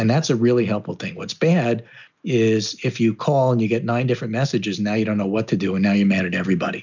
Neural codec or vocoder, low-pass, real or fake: none; 7.2 kHz; real